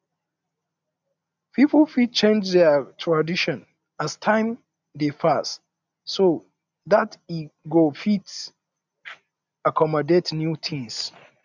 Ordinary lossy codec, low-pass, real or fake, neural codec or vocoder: none; 7.2 kHz; real; none